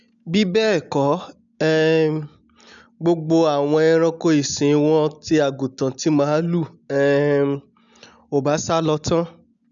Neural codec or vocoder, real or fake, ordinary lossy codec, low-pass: none; real; none; 7.2 kHz